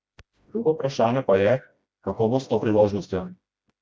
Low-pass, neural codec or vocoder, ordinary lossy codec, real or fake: none; codec, 16 kHz, 1 kbps, FreqCodec, smaller model; none; fake